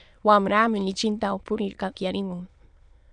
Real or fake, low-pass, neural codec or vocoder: fake; 9.9 kHz; autoencoder, 22.05 kHz, a latent of 192 numbers a frame, VITS, trained on many speakers